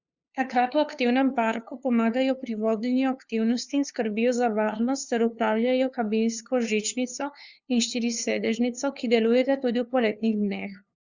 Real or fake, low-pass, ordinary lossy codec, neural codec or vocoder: fake; 7.2 kHz; Opus, 64 kbps; codec, 16 kHz, 2 kbps, FunCodec, trained on LibriTTS, 25 frames a second